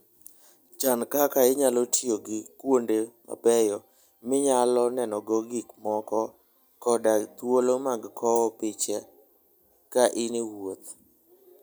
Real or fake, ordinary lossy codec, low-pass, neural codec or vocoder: real; none; none; none